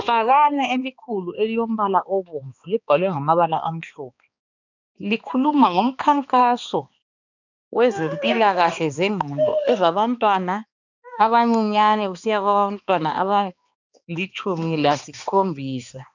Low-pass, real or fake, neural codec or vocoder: 7.2 kHz; fake; codec, 16 kHz, 2 kbps, X-Codec, HuBERT features, trained on balanced general audio